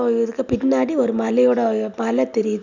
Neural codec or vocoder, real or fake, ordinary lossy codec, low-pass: none; real; none; 7.2 kHz